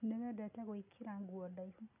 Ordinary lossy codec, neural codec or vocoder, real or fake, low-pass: none; none; real; 3.6 kHz